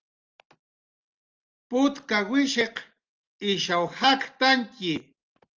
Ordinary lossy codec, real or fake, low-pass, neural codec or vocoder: Opus, 24 kbps; real; 7.2 kHz; none